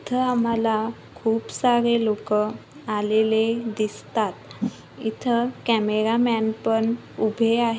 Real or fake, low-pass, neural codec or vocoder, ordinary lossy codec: real; none; none; none